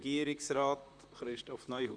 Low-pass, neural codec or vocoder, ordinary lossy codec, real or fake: 9.9 kHz; vocoder, 44.1 kHz, 128 mel bands, Pupu-Vocoder; none; fake